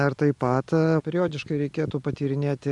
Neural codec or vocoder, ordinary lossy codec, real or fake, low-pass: none; AAC, 64 kbps; real; 10.8 kHz